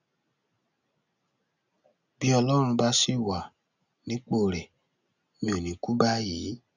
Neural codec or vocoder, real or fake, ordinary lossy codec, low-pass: none; real; none; 7.2 kHz